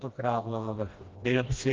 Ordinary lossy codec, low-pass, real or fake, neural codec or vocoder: Opus, 32 kbps; 7.2 kHz; fake; codec, 16 kHz, 1 kbps, FreqCodec, smaller model